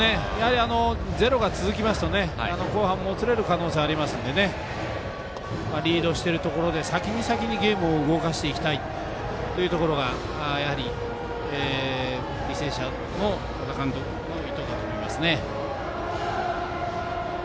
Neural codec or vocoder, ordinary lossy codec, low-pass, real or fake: none; none; none; real